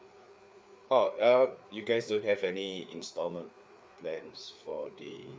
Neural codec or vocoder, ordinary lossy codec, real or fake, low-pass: codec, 16 kHz, 4 kbps, FreqCodec, larger model; none; fake; none